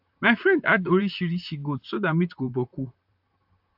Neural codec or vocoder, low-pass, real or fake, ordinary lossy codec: vocoder, 44.1 kHz, 128 mel bands, Pupu-Vocoder; 5.4 kHz; fake; AAC, 48 kbps